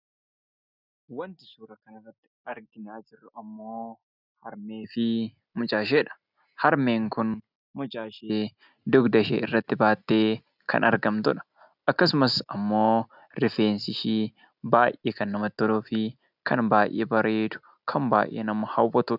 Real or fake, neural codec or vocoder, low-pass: real; none; 5.4 kHz